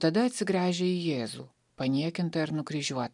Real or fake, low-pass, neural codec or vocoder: real; 10.8 kHz; none